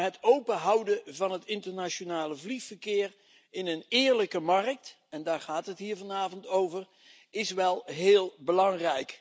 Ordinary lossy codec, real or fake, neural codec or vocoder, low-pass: none; real; none; none